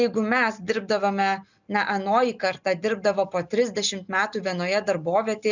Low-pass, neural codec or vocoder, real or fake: 7.2 kHz; none; real